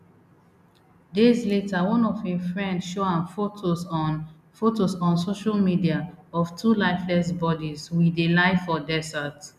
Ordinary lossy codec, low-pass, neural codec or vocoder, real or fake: none; 14.4 kHz; none; real